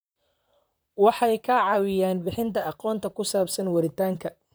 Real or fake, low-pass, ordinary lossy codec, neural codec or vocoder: fake; none; none; vocoder, 44.1 kHz, 128 mel bands, Pupu-Vocoder